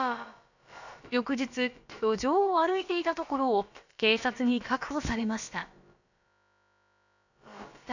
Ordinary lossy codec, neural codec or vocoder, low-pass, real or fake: none; codec, 16 kHz, about 1 kbps, DyCAST, with the encoder's durations; 7.2 kHz; fake